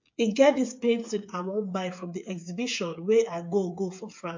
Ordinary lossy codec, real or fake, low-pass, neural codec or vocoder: MP3, 48 kbps; fake; 7.2 kHz; codec, 16 kHz, 8 kbps, FreqCodec, smaller model